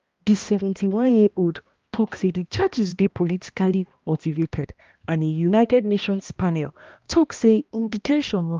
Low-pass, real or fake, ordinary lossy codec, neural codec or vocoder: 7.2 kHz; fake; Opus, 32 kbps; codec, 16 kHz, 1 kbps, X-Codec, HuBERT features, trained on balanced general audio